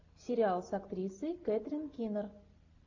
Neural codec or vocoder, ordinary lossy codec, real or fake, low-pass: none; AAC, 48 kbps; real; 7.2 kHz